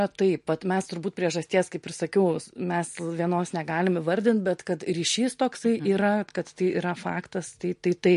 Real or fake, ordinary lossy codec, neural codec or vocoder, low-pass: real; MP3, 48 kbps; none; 10.8 kHz